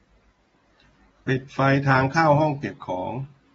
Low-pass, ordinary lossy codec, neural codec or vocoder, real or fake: 19.8 kHz; AAC, 24 kbps; none; real